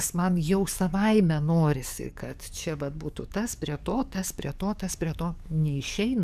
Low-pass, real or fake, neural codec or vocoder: 14.4 kHz; fake; codec, 44.1 kHz, 7.8 kbps, DAC